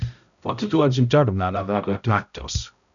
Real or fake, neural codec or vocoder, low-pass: fake; codec, 16 kHz, 0.5 kbps, X-Codec, HuBERT features, trained on balanced general audio; 7.2 kHz